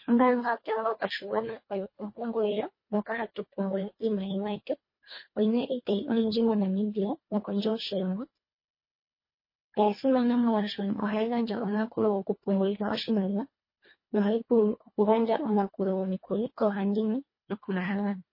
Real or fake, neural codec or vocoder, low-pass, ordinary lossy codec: fake; codec, 24 kHz, 1.5 kbps, HILCodec; 5.4 kHz; MP3, 24 kbps